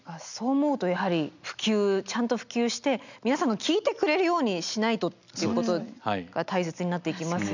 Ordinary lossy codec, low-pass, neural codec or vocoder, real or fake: none; 7.2 kHz; none; real